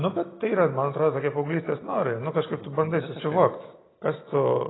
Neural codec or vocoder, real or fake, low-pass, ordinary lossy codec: none; real; 7.2 kHz; AAC, 16 kbps